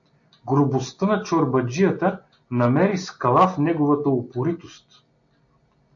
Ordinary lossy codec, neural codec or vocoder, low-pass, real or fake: AAC, 64 kbps; none; 7.2 kHz; real